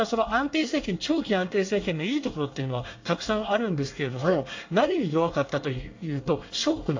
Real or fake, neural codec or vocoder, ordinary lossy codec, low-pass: fake; codec, 24 kHz, 1 kbps, SNAC; AAC, 48 kbps; 7.2 kHz